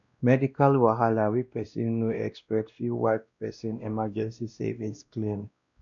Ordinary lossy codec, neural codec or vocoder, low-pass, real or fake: none; codec, 16 kHz, 1 kbps, X-Codec, WavLM features, trained on Multilingual LibriSpeech; 7.2 kHz; fake